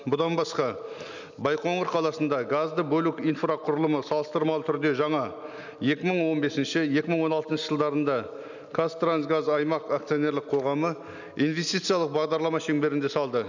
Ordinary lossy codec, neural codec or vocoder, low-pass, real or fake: none; none; 7.2 kHz; real